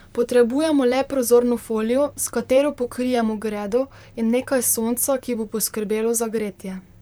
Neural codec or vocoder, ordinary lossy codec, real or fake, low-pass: vocoder, 44.1 kHz, 128 mel bands, Pupu-Vocoder; none; fake; none